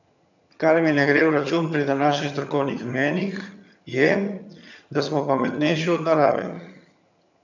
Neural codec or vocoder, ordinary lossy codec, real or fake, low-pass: vocoder, 22.05 kHz, 80 mel bands, HiFi-GAN; none; fake; 7.2 kHz